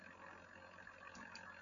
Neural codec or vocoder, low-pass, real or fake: none; 7.2 kHz; real